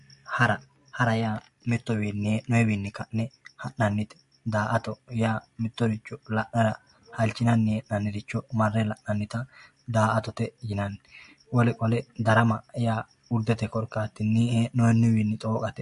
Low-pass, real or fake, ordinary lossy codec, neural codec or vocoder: 10.8 kHz; real; MP3, 48 kbps; none